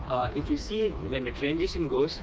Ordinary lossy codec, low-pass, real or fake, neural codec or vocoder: none; none; fake; codec, 16 kHz, 2 kbps, FreqCodec, smaller model